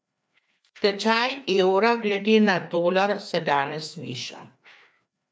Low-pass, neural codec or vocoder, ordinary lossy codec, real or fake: none; codec, 16 kHz, 2 kbps, FreqCodec, larger model; none; fake